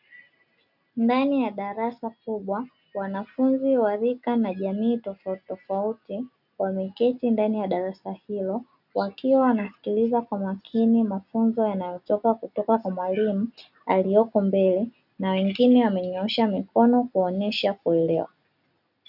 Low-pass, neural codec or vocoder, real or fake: 5.4 kHz; none; real